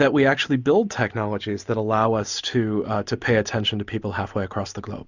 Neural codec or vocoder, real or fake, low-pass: none; real; 7.2 kHz